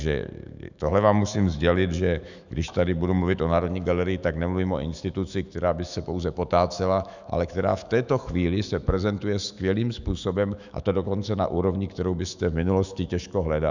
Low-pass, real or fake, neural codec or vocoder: 7.2 kHz; fake; autoencoder, 48 kHz, 128 numbers a frame, DAC-VAE, trained on Japanese speech